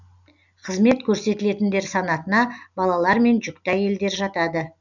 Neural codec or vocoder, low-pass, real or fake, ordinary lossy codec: none; 7.2 kHz; real; none